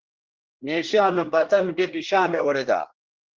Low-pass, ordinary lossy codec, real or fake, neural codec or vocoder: 7.2 kHz; Opus, 16 kbps; fake; codec, 24 kHz, 1 kbps, SNAC